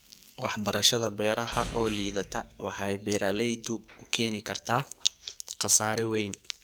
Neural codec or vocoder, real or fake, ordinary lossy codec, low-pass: codec, 44.1 kHz, 2.6 kbps, SNAC; fake; none; none